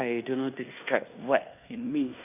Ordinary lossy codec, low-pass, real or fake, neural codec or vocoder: none; 3.6 kHz; fake; codec, 16 kHz in and 24 kHz out, 0.9 kbps, LongCat-Audio-Codec, fine tuned four codebook decoder